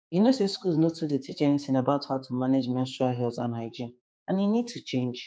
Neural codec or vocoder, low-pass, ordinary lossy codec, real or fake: codec, 16 kHz, 4 kbps, X-Codec, HuBERT features, trained on general audio; none; none; fake